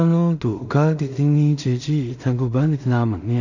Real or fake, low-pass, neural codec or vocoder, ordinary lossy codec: fake; 7.2 kHz; codec, 16 kHz in and 24 kHz out, 0.4 kbps, LongCat-Audio-Codec, two codebook decoder; none